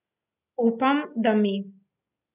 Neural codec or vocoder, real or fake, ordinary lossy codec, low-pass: none; real; none; 3.6 kHz